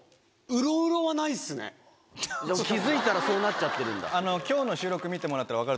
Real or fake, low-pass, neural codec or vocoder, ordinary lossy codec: real; none; none; none